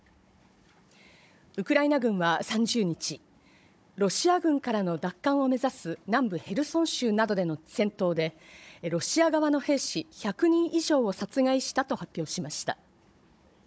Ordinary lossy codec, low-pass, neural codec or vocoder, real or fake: none; none; codec, 16 kHz, 16 kbps, FunCodec, trained on Chinese and English, 50 frames a second; fake